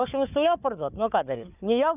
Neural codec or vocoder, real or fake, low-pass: codec, 16 kHz, 4 kbps, FunCodec, trained on Chinese and English, 50 frames a second; fake; 3.6 kHz